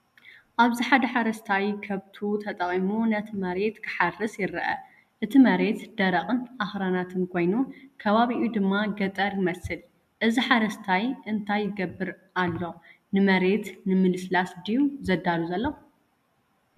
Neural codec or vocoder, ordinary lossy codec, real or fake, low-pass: none; MP3, 96 kbps; real; 14.4 kHz